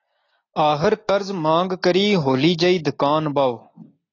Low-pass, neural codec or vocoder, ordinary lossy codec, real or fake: 7.2 kHz; none; AAC, 32 kbps; real